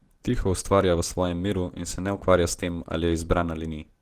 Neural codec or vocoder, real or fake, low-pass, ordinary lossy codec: none; real; 14.4 kHz; Opus, 16 kbps